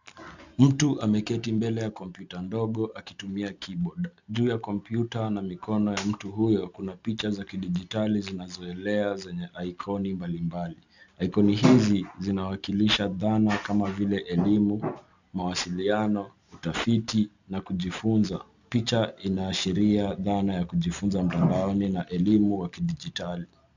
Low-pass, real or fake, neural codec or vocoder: 7.2 kHz; real; none